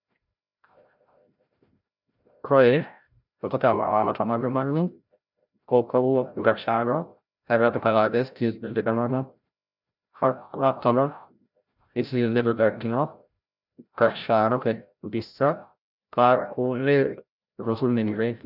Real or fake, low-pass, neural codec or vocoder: fake; 5.4 kHz; codec, 16 kHz, 0.5 kbps, FreqCodec, larger model